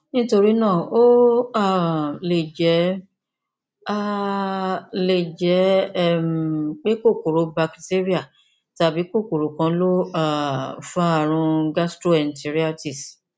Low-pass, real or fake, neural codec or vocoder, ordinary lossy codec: none; real; none; none